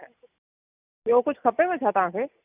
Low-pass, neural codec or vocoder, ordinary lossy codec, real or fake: 3.6 kHz; none; none; real